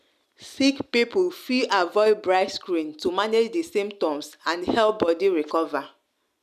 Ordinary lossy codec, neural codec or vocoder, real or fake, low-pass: none; none; real; 14.4 kHz